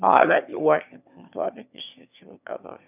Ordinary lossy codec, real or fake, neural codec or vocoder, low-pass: none; fake; autoencoder, 22.05 kHz, a latent of 192 numbers a frame, VITS, trained on one speaker; 3.6 kHz